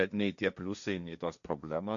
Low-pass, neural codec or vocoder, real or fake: 7.2 kHz; codec, 16 kHz, 1.1 kbps, Voila-Tokenizer; fake